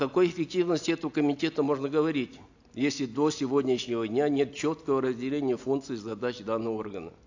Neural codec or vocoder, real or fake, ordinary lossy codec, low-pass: none; real; AAC, 48 kbps; 7.2 kHz